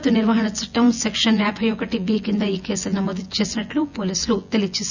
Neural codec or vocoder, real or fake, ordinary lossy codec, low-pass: vocoder, 24 kHz, 100 mel bands, Vocos; fake; none; 7.2 kHz